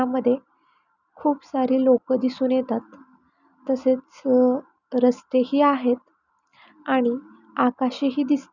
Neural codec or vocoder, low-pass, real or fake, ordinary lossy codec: none; 7.2 kHz; real; none